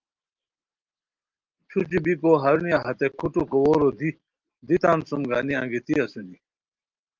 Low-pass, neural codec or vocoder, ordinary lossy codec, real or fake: 7.2 kHz; none; Opus, 32 kbps; real